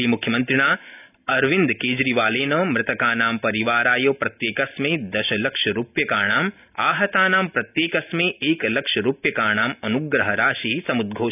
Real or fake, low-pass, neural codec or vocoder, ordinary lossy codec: real; 3.6 kHz; none; none